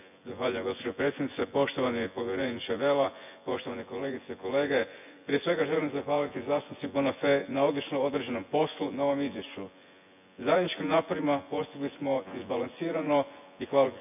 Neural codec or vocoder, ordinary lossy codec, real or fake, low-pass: vocoder, 24 kHz, 100 mel bands, Vocos; none; fake; 3.6 kHz